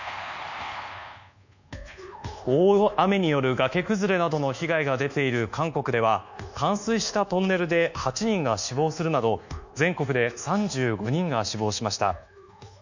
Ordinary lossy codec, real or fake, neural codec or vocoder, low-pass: none; fake; codec, 24 kHz, 1.2 kbps, DualCodec; 7.2 kHz